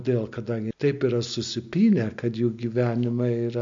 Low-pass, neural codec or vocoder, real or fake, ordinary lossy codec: 7.2 kHz; none; real; MP3, 48 kbps